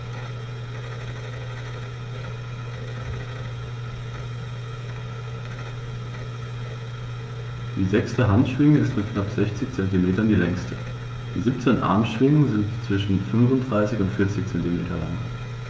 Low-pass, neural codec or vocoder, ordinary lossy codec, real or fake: none; codec, 16 kHz, 16 kbps, FreqCodec, smaller model; none; fake